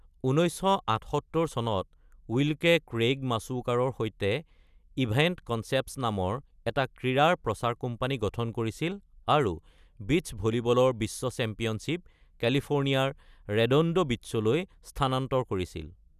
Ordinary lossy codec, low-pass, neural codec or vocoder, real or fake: none; 14.4 kHz; none; real